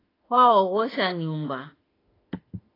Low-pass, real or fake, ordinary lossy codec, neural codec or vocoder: 5.4 kHz; fake; AAC, 24 kbps; autoencoder, 48 kHz, 32 numbers a frame, DAC-VAE, trained on Japanese speech